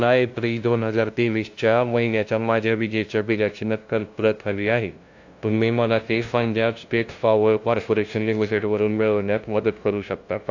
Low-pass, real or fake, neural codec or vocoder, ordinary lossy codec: 7.2 kHz; fake; codec, 16 kHz, 0.5 kbps, FunCodec, trained on LibriTTS, 25 frames a second; AAC, 48 kbps